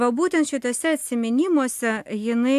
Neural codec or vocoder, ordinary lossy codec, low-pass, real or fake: none; AAC, 96 kbps; 14.4 kHz; real